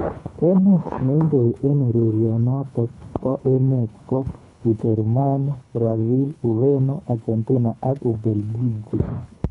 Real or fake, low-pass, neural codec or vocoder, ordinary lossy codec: fake; 10.8 kHz; codec, 24 kHz, 3 kbps, HILCodec; none